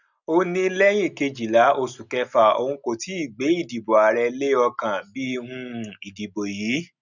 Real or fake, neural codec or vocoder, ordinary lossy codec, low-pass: real; none; none; 7.2 kHz